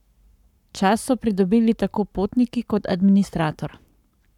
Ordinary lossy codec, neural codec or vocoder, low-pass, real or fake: none; codec, 44.1 kHz, 7.8 kbps, Pupu-Codec; 19.8 kHz; fake